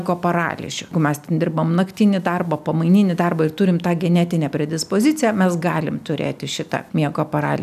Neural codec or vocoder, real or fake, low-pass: none; real; 14.4 kHz